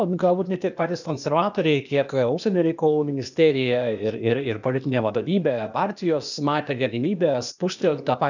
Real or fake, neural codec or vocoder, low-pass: fake; codec, 16 kHz, 0.8 kbps, ZipCodec; 7.2 kHz